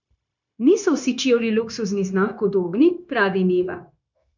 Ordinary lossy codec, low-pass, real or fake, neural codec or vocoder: none; 7.2 kHz; fake; codec, 16 kHz, 0.9 kbps, LongCat-Audio-Codec